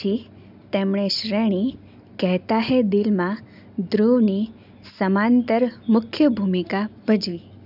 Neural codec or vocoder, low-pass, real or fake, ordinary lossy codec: none; 5.4 kHz; real; none